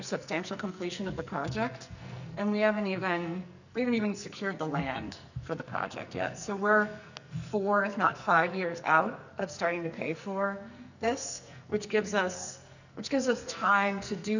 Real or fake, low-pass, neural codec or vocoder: fake; 7.2 kHz; codec, 44.1 kHz, 2.6 kbps, SNAC